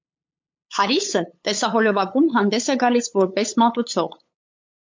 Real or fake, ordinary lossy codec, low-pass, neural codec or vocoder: fake; MP3, 48 kbps; 7.2 kHz; codec, 16 kHz, 8 kbps, FunCodec, trained on LibriTTS, 25 frames a second